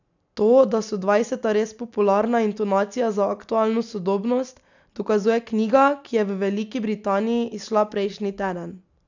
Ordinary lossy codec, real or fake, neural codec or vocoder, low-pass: none; real; none; 7.2 kHz